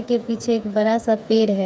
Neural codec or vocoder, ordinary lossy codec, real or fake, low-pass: codec, 16 kHz, 4 kbps, FreqCodec, smaller model; none; fake; none